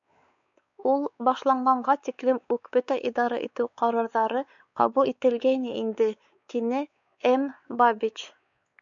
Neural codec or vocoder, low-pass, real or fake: codec, 16 kHz, 4 kbps, X-Codec, WavLM features, trained on Multilingual LibriSpeech; 7.2 kHz; fake